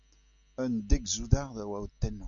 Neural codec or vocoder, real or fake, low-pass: none; real; 7.2 kHz